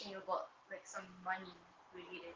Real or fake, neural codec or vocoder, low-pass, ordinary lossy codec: real; none; 7.2 kHz; Opus, 16 kbps